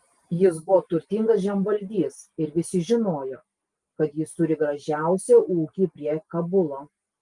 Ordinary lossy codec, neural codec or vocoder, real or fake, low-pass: Opus, 24 kbps; none; real; 10.8 kHz